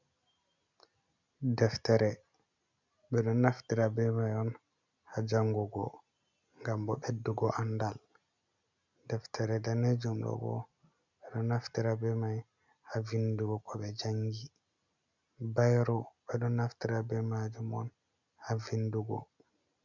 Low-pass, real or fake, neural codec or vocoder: 7.2 kHz; real; none